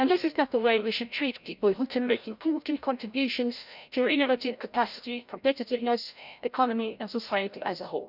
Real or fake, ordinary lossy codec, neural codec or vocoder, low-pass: fake; none; codec, 16 kHz, 0.5 kbps, FreqCodec, larger model; 5.4 kHz